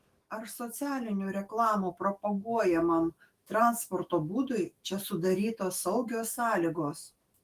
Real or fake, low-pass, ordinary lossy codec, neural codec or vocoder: fake; 14.4 kHz; Opus, 24 kbps; autoencoder, 48 kHz, 128 numbers a frame, DAC-VAE, trained on Japanese speech